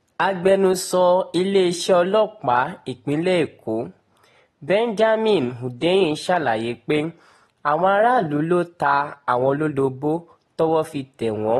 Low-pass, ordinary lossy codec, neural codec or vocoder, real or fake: 19.8 kHz; AAC, 32 kbps; none; real